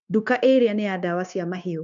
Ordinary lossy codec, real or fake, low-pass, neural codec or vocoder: none; fake; 7.2 kHz; codec, 16 kHz, 0.9 kbps, LongCat-Audio-Codec